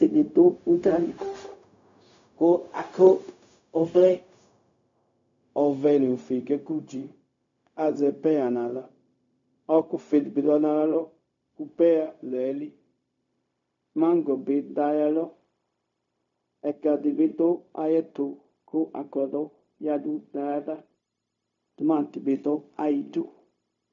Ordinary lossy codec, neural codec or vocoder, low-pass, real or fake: MP3, 64 kbps; codec, 16 kHz, 0.4 kbps, LongCat-Audio-Codec; 7.2 kHz; fake